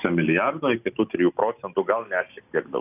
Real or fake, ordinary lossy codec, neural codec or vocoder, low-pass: real; AAC, 32 kbps; none; 3.6 kHz